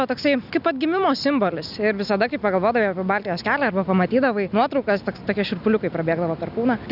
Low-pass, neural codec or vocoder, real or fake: 5.4 kHz; none; real